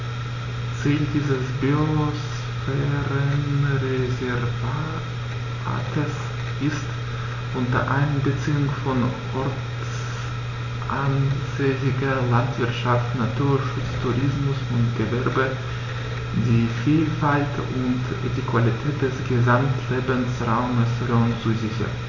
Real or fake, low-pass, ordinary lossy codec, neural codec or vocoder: real; 7.2 kHz; none; none